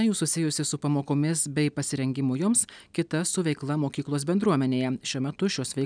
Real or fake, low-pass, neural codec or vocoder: real; 9.9 kHz; none